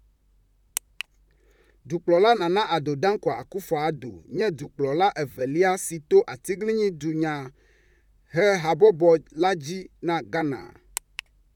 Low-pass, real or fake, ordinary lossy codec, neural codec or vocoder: 19.8 kHz; fake; none; vocoder, 44.1 kHz, 128 mel bands, Pupu-Vocoder